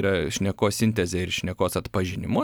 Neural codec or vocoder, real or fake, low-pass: vocoder, 44.1 kHz, 128 mel bands every 256 samples, BigVGAN v2; fake; 19.8 kHz